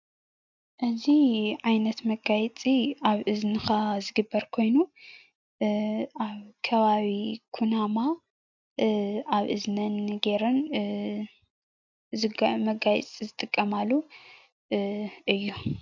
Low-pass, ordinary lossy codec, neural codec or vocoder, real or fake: 7.2 kHz; MP3, 64 kbps; none; real